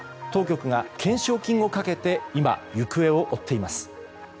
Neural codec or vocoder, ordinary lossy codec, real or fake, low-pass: none; none; real; none